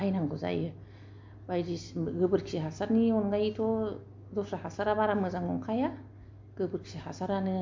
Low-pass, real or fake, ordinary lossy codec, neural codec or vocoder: 7.2 kHz; real; MP3, 48 kbps; none